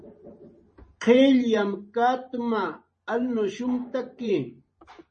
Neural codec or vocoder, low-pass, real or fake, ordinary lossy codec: vocoder, 44.1 kHz, 128 mel bands every 512 samples, BigVGAN v2; 10.8 kHz; fake; MP3, 32 kbps